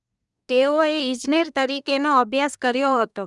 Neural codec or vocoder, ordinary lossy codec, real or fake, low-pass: codec, 24 kHz, 1 kbps, SNAC; none; fake; 10.8 kHz